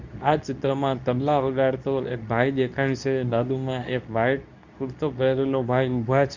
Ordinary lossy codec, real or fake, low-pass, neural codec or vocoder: none; fake; 7.2 kHz; codec, 24 kHz, 0.9 kbps, WavTokenizer, medium speech release version 2